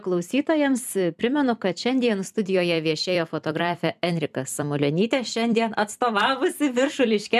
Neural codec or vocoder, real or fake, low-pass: vocoder, 44.1 kHz, 128 mel bands every 256 samples, BigVGAN v2; fake; 14.4 kHz